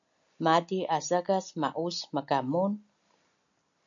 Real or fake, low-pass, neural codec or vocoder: real; 7.2 kHz; none